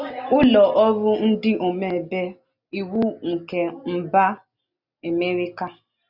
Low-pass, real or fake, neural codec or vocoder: 5.4 kHz; real; none